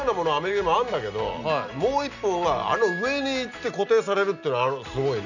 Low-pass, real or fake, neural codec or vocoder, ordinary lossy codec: 7.2 kHz; real; none; none